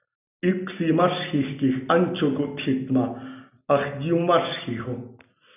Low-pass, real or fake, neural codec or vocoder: 3.6 kHz; real; none